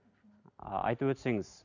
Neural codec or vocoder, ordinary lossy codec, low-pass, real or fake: none; none; 7.2 kHz; real